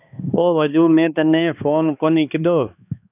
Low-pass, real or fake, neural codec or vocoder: 3.6 kHz; fake; codec, 16 kHz, 2 kbps, X-Codec, HuBERT features, trained on balanced general audio